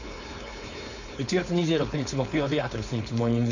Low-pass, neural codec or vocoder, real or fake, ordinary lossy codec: 7.2 kHz; codec, 16 kHz, 4.8 kbps, FACodec; fake; none